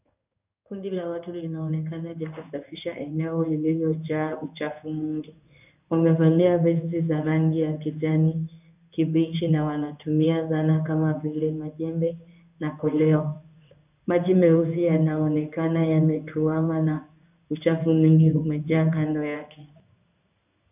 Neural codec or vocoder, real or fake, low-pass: codec, 16 kHz in and 24 kHz out, 1 kbps, XY-Tokenizer; fake; 3.6 kHz